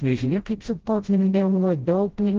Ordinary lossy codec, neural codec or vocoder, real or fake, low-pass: Opus, 16 kbps; codec, 16 kHz, 0.5 kbps, FreqCodec, smaller model; fake; 7.2 kHz